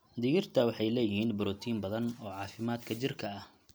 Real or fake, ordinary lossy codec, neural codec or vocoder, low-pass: real; none; none; none